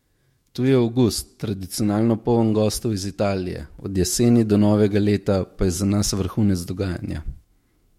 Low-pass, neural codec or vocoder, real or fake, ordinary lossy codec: 19.8 kHz; autoencoder, 48 kHz, 128 numbers a frame, DAC-VAE, trained on Japanese speech; fake; MP3, 64 kbps